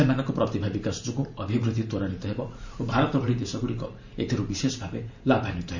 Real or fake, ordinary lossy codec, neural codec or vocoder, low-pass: fake; none; vocoder, 44.1 kHz, 128 mel bands every 512 samples, BigVGAN v2; 7.2 kHz